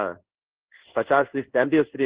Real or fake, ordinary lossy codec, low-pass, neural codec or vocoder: fake; Opus, 16 kbps; 3.6 kHz; codec, 16 kHz in and 24 kHz out, 1 kbps, XY-Tokenizer